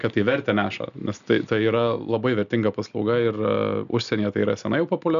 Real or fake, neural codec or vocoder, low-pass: real; none; 7.2 kHz